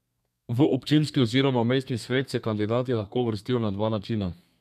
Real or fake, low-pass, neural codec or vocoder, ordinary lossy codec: fake; 14.4 kHz; codec, 32 kHz, 1.9 kbps, SNAC; none